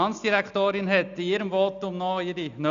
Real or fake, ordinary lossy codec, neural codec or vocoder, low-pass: real; none; none; 7.2 kHz